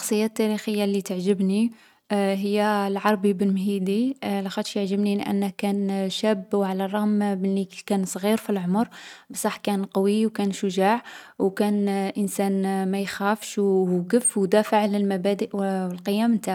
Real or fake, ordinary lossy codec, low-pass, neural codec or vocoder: real; none; 19.8 kHz; none